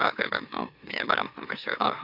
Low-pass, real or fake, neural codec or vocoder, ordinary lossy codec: 5.4 kHz; fake; autoencoder, 44.1 kHz, a latent of 192 numbers a frame, MeloTTS; AAC, 48 kbps